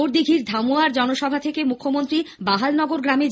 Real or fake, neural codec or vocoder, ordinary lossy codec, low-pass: real; none; none; none